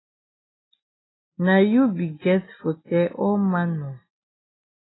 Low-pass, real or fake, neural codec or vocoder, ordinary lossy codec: 7.2 kHz; real; none; AAC, 16 kbps